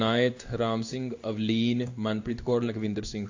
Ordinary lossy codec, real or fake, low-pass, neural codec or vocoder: AAC, 48 kbps; fake; 7.2 kHz; codec, 16 kHz in and 24 kHz out, 1 kbps, XY-Tokenizer